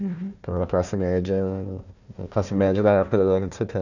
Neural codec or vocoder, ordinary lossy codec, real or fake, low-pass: codec, 16 kHz, 1 kbps, FunCodec, trained on Chinese and English, 50 frames a second; none; fake; 7.2 kHz